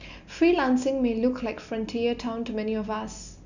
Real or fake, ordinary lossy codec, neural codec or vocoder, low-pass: real; none; none; 7.2 kHz